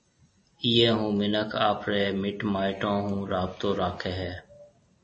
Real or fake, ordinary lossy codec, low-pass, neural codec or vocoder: real; MP3, 32 kbps; 9.9 kHz; none